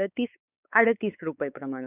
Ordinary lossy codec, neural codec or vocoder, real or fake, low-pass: none; codec, 16 kHz, 4 kbps, X-Codec, WavLM features, trained on Multilingual LibriSpeech; fake; 3.6 kHz